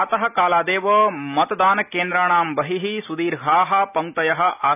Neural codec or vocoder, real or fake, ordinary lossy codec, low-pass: none; real; none; 3.6 kHz